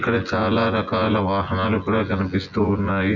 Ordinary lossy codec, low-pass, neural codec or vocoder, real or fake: none; 7.2 kHz; vocoder, 24 kHz, 100 mel bands, Vocos; fake